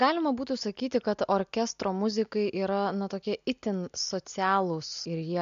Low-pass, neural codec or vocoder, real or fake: 7.2 kHz; none; real